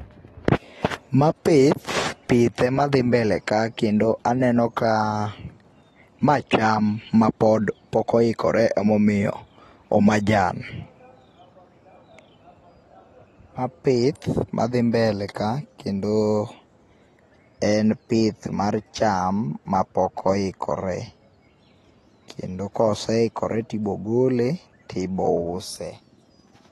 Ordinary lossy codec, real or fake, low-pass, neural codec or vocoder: AAC, 32 kbps; real; 19.8 kHz; none